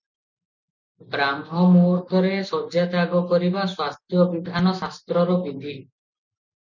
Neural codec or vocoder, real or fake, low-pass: none; real; 7.2 kHz